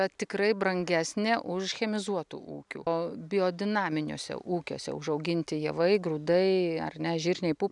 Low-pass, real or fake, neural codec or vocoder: 10.8 kHz; real; none